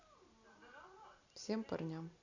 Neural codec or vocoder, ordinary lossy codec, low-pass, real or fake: none; Opus, 64 kbps; 7.2 kHz; real